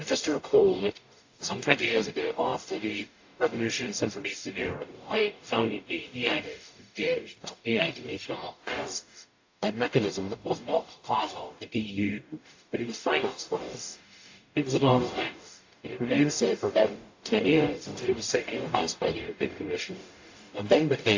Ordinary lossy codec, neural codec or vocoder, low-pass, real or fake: AAC, 48 kbps; codec, 44.1 kHz, 0.9 kbps, DAC; 7.2 kHz; fake